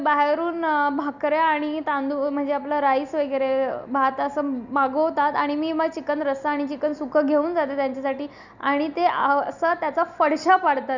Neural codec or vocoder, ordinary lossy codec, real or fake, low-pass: none; none; real; 7.2 kHz